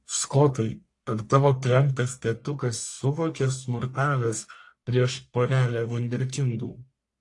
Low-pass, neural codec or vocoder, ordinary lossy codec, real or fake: 10.8 kHz; codec, 44.1 kHz, 1.7 kbps, Pupu-Codec; AAC, 48 kbps; fake